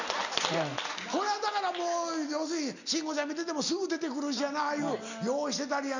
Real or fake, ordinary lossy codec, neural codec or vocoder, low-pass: real; none; none; 7.2 kHz